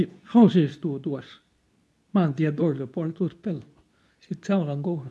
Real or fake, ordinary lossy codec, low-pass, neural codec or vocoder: fake; none; none; codec, 24 kHz, 0.9 kbps, WavTokenizer, medium speech release version 2